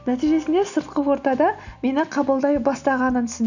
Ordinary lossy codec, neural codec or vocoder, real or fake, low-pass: none; none; real; 7.2 kHz